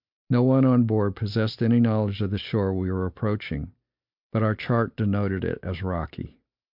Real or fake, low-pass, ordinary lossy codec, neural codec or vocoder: real; 5.4 kHz; MP3, 48 kbps; none